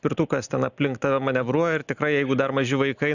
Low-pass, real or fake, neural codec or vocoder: 7.2 kHz; real; none